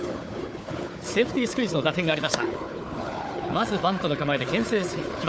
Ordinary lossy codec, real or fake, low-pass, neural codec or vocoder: none; fake; none; codec, 16 kHz, 4 kbps, FunCodec, trained on Chinese and English, 50 frames a second